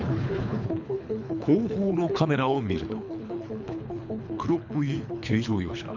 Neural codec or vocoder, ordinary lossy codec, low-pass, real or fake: codec, 24 kHz, 3 kbps, HILCodec; MP3, 64 kbps; 7.2 kHz; fake